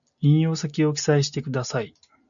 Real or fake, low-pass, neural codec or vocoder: real; 7.2 kHz; none